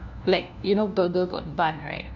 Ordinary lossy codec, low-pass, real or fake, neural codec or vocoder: none; 7.2 kHz; fake; codec, 16 kHz, 1 kbps, FunCodec, trained on LibriTTS, 50 frames a second